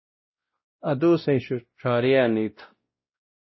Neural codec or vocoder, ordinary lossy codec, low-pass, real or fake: codec, 16 kHz, 0.5 kbps, X-Codec, WavLM features, trained on Multilingual LibriSpeech; MP3, 24 kbps; 7.2 kHz; fake